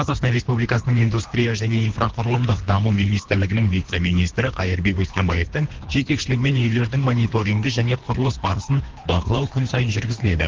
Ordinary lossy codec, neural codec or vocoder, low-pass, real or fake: Opus, 16 kbps; codec, 24 kHz, 3 kbps, HILCodec; 7.2 kHz; fake